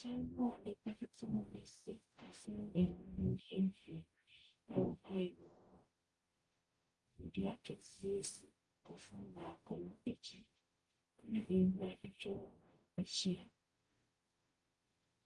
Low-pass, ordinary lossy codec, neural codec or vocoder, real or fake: 10.8 kHz; Opus, 32 kbps; codec, 44.1 kHz, 0.9 kbps, DAC; fake